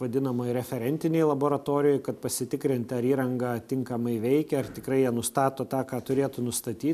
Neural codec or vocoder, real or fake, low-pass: none; real; 14.4 kHz